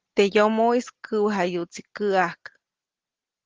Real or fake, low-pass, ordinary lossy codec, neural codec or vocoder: real; 7.2 kHz; Opus, 16 kbps; none